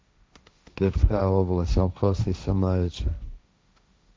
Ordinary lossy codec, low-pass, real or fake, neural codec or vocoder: none; none; fake; codec, 16 kHz, 1.1 kbps, Voila-Tokenizer